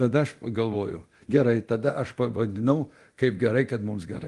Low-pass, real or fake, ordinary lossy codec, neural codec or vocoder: 10.8 kHz; fake; Opus, 24 kbps; codec, 24 kHz, 0.9 kbps, DualCodec